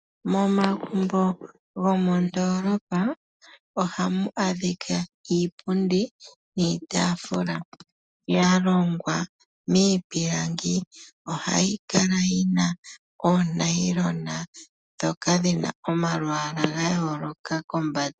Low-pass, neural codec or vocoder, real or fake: 9.9 kHz; none; real